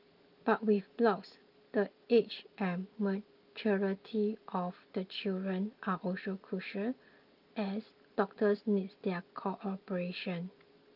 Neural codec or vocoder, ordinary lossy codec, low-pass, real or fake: none; Opus, 24 kbps; 5.4 kHz; real